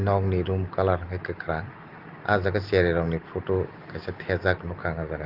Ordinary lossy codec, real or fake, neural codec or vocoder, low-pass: Opus, 32 kbps; real; none; 5.4 kHz